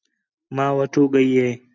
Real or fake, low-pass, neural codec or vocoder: real; 7.2 kHz; none